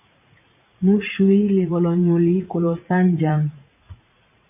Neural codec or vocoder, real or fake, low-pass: vocoder, 44.1 kHz, 80 mel bands, Vocos; fake; 3.6 kHz